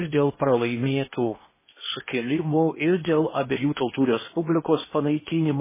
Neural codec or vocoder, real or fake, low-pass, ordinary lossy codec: codec, 16 kHz in and 24 kHz out, 0.8 kbps, FocalCodec, streaming, 65536 codes; fake; 3.6 kHz; MP3, 16 kbps